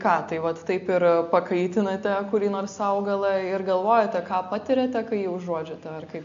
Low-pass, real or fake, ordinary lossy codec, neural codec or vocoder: 7.2 kHz; real; MP3, 48 kbps; none